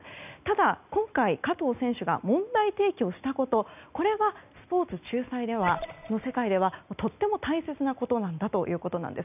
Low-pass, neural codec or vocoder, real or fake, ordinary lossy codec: 3.6 kHz; none; real; none